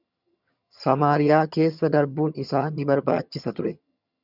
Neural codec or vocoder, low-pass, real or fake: vocoder, 22.05 kHz, 80 mel bands, HiFi-GAN; 5.4 kHz; fake